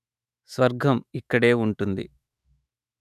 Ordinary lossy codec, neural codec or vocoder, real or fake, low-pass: none; autoencoder, 48 kHz, 128 numbers a frame, DAC-VAE, trained on Japanese speech; fake; 14.4 kHz